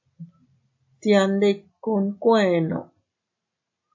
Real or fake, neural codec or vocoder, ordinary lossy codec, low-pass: real; none; AAC, 48 kbps; 7.2 kHz